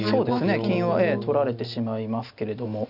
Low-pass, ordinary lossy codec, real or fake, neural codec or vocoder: 5.4 kHz; none; real; none